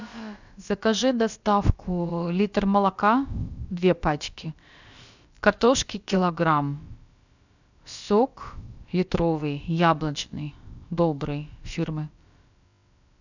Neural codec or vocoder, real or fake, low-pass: codec, 16 kHz, about 1 kbps, DyCAST, with the encoder's durations; fake; 7.2 kHz